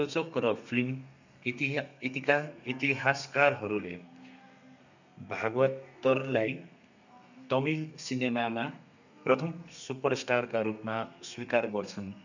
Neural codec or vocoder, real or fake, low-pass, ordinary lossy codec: codec, 32 kHz, 1.9 kbps, SNAC; fake; 7.2 kHz; none